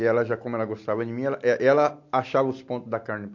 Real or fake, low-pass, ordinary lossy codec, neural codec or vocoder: real; 7.2 kHz; MP3, 48 kbps; none